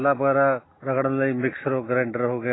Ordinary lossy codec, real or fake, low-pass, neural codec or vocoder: AAC, 16 kbps; real; 7.2 kHz; none